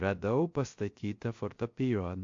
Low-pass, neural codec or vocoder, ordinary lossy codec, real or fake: 7.2 kHz; codec, 16 kHz, 0.3 kbps, FocalCodec; MP3, 48 kbps; fake